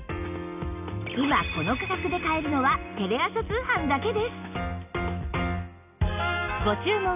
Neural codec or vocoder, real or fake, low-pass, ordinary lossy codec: none; real; 3.6 kHz; none